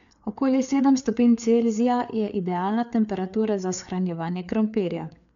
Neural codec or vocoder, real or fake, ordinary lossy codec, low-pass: codec, 16 kHz, 4 kbps, FreqCodec, larger model; fake; none; 7.2 kHz